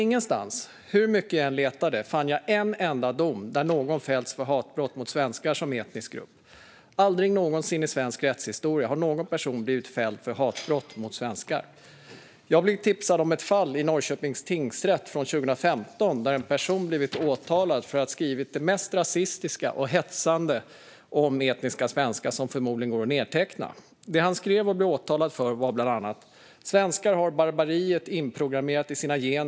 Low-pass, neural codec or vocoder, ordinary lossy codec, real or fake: none; none; none; real